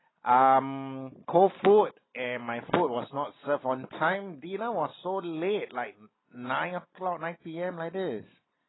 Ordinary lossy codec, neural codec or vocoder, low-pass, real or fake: AAC, 16 kbps; none; 7.2 kHz; real